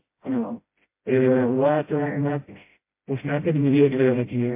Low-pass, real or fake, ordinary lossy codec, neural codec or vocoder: 3.6 kHz; fake; MP3, 24 kbps; codec, 16 kHz, 0.5 kbps, FreqCodec, smaller model